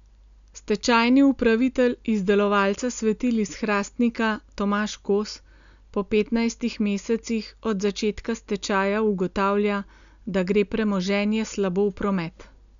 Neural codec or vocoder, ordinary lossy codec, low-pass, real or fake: none; none; 7.2 kHz; real